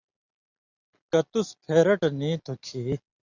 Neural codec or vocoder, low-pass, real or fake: none; 7.2 kHz; real